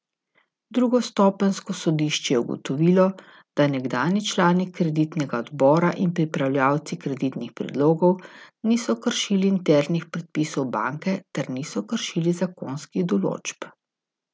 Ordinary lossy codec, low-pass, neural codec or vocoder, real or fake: none; none; none; real